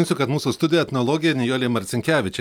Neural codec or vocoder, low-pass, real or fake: none; 19.8 kHz; real